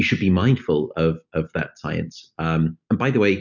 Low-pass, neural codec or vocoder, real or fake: 7.2 kHz; none; real